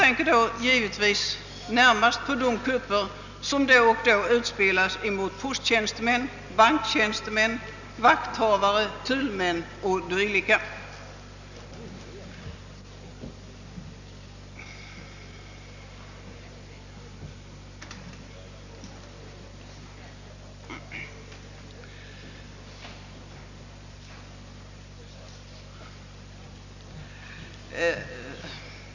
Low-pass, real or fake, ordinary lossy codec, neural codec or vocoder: 7.2 kHz; real; none; none